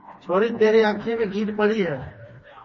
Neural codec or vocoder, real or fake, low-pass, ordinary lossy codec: codec, 16 kHz, 2 kbps, FreqCodec, smaller model; fake; 7.2 kHz; MP3, 32 kbps